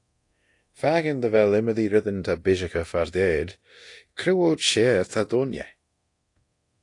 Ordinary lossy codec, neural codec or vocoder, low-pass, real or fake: AAC, 48 kbps; codec, 24 kHz, 0.9 kbps, DualCodec; 10.8 kHz; fake